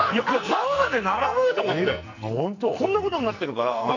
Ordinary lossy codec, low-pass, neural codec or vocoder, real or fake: none; 7.2 kHz; codec, 44.1 kHz, 2.6 kbps, SNAC; fake